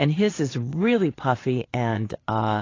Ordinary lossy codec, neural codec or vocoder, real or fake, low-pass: AAC, 32 kbps; vocoder, 22.05 kHz, 80 mel bands, Vocos; fake; 7.2 kHz